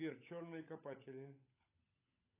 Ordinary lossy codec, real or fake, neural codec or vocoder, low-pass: AAC, 16 kbps; fake; codec, 16 kHz, 16 kbps, FunCodec, trained on LibriTTS, 50 frames a second; 3.6 kHz